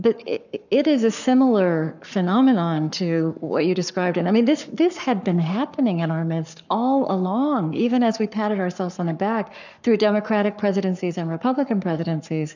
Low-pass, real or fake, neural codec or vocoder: 7.2 kHz; fake; codec, 44.1 kHz, 7.8 kbps, Pupu-Codec